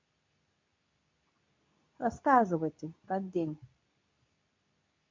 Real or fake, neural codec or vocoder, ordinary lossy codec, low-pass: fake; codec, 24 kHz, 0.9 kbps, WavTokenizer, medium speech release version 2; MP3, 48 kbps; 7.2 kHz